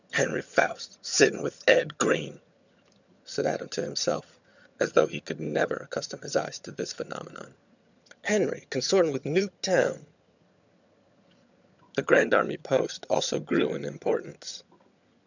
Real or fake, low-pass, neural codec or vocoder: fake; 7.2 kHz; vocoder, 22.05 kHz, 80 mel bands, HiFi-GAN